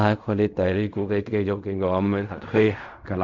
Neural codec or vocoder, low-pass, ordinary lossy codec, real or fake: codec, 16 kHz in and 24 kHz out, 0.4 kbps, LongCat-Audio-Codec, fine tuned four codebook decoder; 7.2 kHz; none; fake